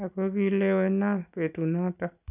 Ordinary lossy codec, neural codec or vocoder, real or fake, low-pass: none; none; real; 3.6 kHz